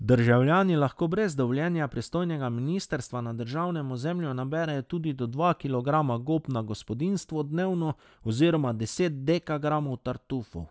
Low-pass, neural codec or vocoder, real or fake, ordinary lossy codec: none; none; real; none